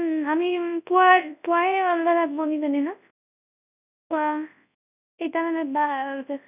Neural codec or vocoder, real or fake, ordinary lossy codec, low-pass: codec, 24 kHz, 0.9 kbps, WavTokenizer, large speech release; fake; none; 3.6 kHz